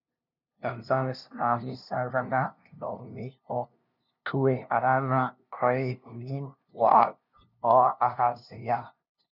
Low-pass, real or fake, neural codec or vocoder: 5.4 kHz; fake; codec, 16 kHz, 0.5 kbps, FunCodec, trained on LibriTTS, 25 frames a second